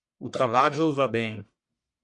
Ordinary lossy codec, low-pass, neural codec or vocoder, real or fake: MP3, 64 kbps; 10.8 kHz; codec, 44.1 kHz, 1.7 kbps, Pupu-Codec; fake